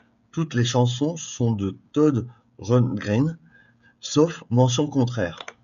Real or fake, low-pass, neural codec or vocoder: fake; 7.2 kHz; codec, 16 kHz, 16 kbps, FreqCodec, smaller model